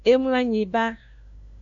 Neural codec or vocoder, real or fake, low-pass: codec, 16 kHz, 1 kbps, FunCodec, trained on LibriTTS, 50 frames a second; fake; 7.2 kHz